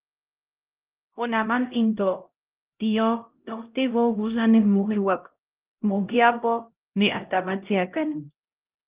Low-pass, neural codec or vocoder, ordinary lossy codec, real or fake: 3.6 kHz; codec, 16 kHz, 0.5 kbps, X-Codec, HuBERT features, trained on LibriSpeech; Opus, 32 kbps; fake